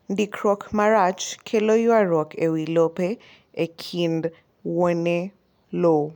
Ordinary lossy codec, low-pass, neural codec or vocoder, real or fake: none; 19.8 kHz; none; real